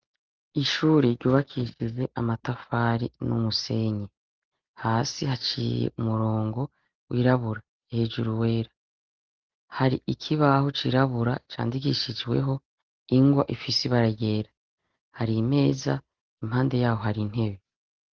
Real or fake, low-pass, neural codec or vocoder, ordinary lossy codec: real; 7.2 kHz; none; Opus, 16 kbps